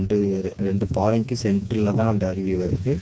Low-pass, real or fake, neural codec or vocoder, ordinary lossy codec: none; fake; codec, 16 kHz, 2 kbps, FreqCodec, smaller model; none